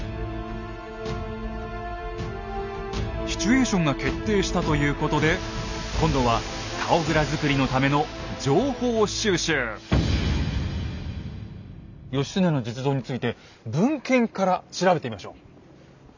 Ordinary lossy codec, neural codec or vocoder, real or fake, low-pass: none; none; real; 7.2 kHz